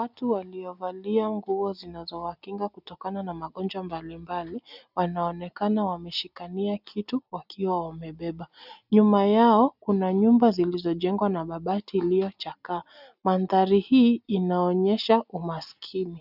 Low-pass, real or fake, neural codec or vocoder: 5.4 kHz; real; none